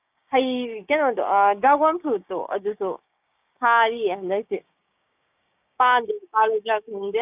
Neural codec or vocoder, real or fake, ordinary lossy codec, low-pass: codec, 16 kHz, 6 kbps, DAC; fake; none; 3.6 kHz